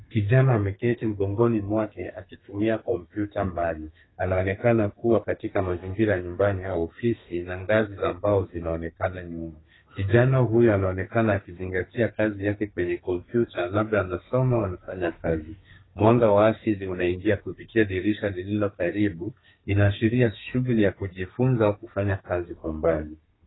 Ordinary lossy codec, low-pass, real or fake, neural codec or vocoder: AAC, 16 kbps; 7.2 kHz; fake; codec, 32 kHz, 1.9 kbps, SNAC